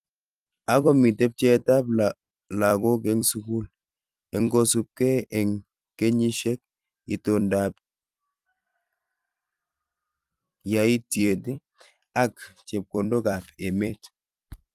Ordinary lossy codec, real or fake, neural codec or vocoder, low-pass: Opus, 32 kbps; fake; vocoder, 44.1 kHz, 128 mel bands every 256 samples, BigVGAN v2; 14.4 kHz